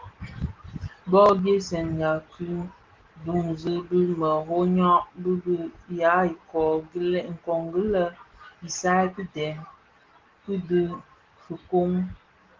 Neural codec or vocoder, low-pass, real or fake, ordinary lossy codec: none; 7.2 kHz; real; Opus, 16 kbps